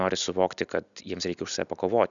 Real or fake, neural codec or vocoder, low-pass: real; none; 7.2 kHz